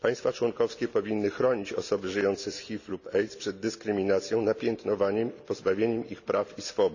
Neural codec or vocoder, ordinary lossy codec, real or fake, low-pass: none; none; real; 7.2 kHz